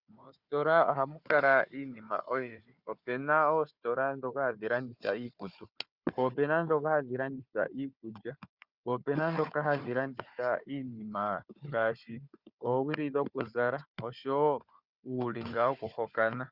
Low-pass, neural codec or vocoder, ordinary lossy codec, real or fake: 5.4 kHz; codec, 16 kHz, 4 kbps, FunCodec, trained on Chinese and English, 50 frames a second; Opus, 64 kbps; fake